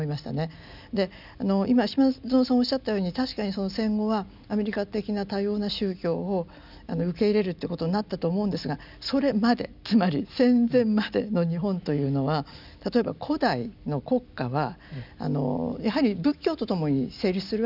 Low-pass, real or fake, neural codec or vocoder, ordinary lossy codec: 5.4 kHz; real; none; none